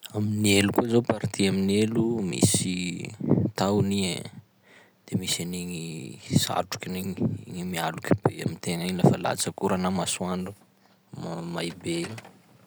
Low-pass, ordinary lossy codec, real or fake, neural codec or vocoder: none; none; real; none